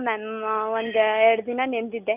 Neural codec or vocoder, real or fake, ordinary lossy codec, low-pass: none; real; none; 3.6 kHz